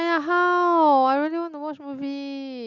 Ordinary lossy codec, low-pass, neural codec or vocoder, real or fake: Opus, 64 kbps; 7.2 kHz; none; real